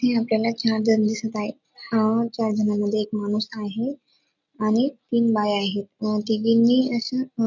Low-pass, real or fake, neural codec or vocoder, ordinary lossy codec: 7.2 kHz; real; none; none